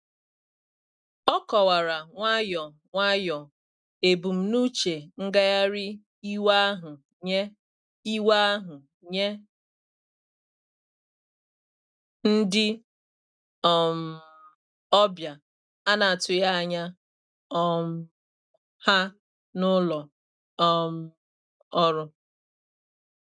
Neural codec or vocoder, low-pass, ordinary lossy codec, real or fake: none; 9.9 kHz; none; real